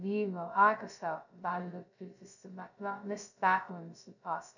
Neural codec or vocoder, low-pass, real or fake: codec, 16 kHz, 0.2 kbps, FocalCodec; 7.2 kHz; fake